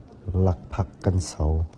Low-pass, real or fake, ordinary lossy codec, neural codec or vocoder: 10.8 kHz; real; Opus, 16 kbps; none